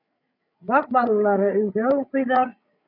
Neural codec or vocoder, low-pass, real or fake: codec, 16 kHz, 16 kbps, FreqCodec, larger model; 5.4 kHz; fake